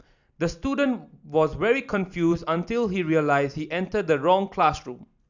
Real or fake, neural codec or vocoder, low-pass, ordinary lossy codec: real; none; 7.2 kHz; none